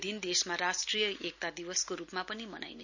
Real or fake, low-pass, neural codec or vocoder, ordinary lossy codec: real; 7.2 kHz; none; none